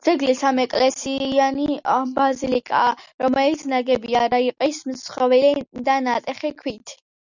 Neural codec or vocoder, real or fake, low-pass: none; real; 7.2 kHz